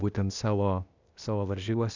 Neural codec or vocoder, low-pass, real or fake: codec, 16 kHz, 0.8 kbps, ZipCodec; 7.2 kHz; fake